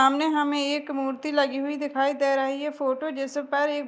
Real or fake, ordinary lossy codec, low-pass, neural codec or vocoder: real; none; none; none